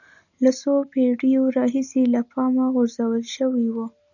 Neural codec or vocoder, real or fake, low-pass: none; real; 7.2 kHz